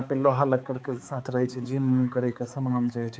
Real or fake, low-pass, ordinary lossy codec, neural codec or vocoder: fake; none; none; codec, 16 kHz, 4 kbps, X-Codec, HuBERT features, trained on balanced general audio